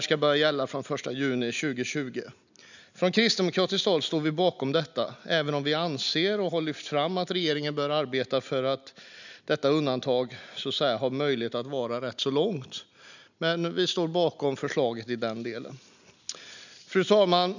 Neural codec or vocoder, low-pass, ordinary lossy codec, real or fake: none; 7.2 kHz; none; real